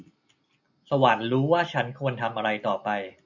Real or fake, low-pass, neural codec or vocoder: real; 7.2 kHz; none